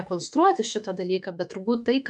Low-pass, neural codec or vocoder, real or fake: 10.8 kHz; autoencoder, 48 kHz, 32 numbers a frame, DAC-VAE, trained on Japanese speech; fake